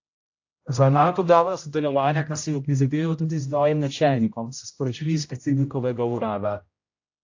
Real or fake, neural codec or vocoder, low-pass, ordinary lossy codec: fake; codec, 16 kHz, 0.5 kbps, X-Codec, HuBERT features, trained on general audio; 7.2 kHz; AAC, 48 kbps